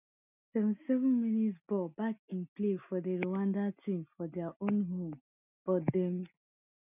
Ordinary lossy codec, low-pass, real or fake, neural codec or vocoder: none; 3.6 kHz; real; none